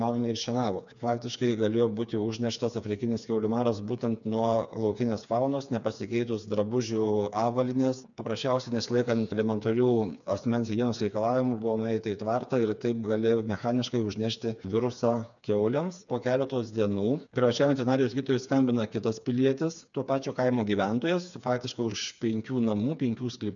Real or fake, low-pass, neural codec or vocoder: fake; 7.2 kHz; codec, 16 kHz, 4 kbps, FreqCodec, smaller model